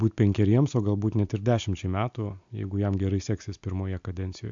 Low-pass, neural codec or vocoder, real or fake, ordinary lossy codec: 7.2 kHz; none; real; AAC, 64 kbps